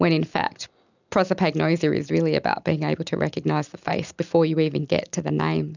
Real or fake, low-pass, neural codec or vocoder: real; 7.2 kHz; none